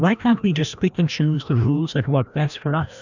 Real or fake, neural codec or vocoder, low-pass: fake; codec, 16 kHz, 1 kbps, FreqCodec, larger model; 7.2 kHz